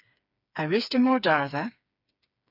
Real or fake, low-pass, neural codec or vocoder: fake; 5.4 kHz; codec, 16 kHz, 4 kbps, FreqCodec, smaller model